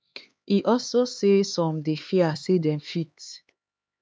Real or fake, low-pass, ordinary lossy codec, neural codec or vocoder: fake; none; none; codec, 16 kHz, 4 kbps, X-Codec, HuBERT features, trained on LibriSpeech